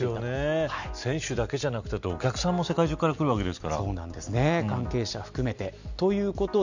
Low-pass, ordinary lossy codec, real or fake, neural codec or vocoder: 7.2 kHz; none; real; none